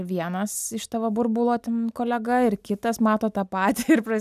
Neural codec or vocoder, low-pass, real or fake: none; 14.4 kHz; real